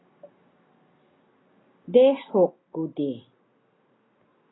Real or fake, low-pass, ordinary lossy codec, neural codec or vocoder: real; 7.2 kHz; AAC, 16 kbps; none